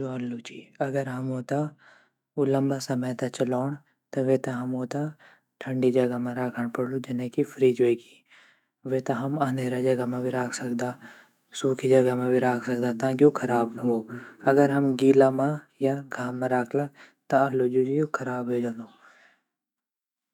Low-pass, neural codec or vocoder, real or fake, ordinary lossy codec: 19.8 kHz; autoencoder, 48 kHz, 128 numbers a frame, DAC-VAE, trained on Japanese speech; fake; none